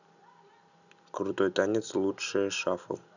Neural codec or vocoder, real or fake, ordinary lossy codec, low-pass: none; real; none; 7.2 kHz